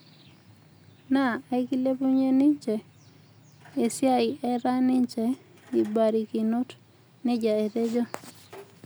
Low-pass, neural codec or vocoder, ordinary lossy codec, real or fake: none; none; none; real